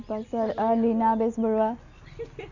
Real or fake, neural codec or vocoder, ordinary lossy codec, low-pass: real; none; none; 7.2 kHz